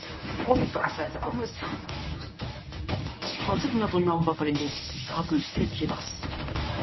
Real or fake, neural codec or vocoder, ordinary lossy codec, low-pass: fake; codec, 24 kHz, 0.9 kbps, WavTokenizer, medium speech release version 1; MP3, 24 kbps; 7.2 kHz